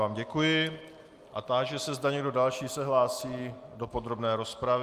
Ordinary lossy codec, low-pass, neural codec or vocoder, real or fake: Opus, 32 kbps; 14.4 kHz; none; real